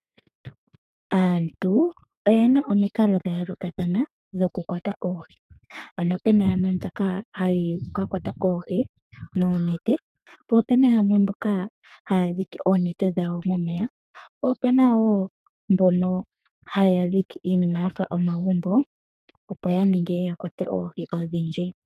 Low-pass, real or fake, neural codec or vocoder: 14.4 kHz; fake; codec, 32 kHz, 1.9 kbps, SNAC